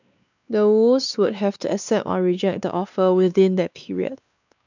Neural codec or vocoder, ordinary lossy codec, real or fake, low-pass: codec, 16 kHz, 2 kbps, X-Codec, WavLM features, trained on Multilingual LibriSpeech; none; fake; 7.2 kHz